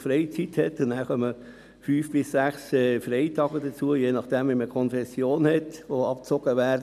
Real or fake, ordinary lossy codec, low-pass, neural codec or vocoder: real; none; 14.4 kHz; none